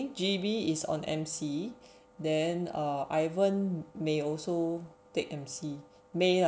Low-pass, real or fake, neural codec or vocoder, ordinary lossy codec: none; real; none; none